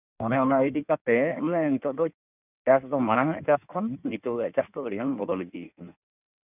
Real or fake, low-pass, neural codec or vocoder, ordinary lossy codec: fake; 3.6 kHz; codec, 16 kHz in and 24 kHz out, 1.1 kbps, FireRedTTS-2 codec; none